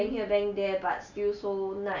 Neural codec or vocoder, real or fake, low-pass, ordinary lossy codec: none; real; 7.2 kHz; none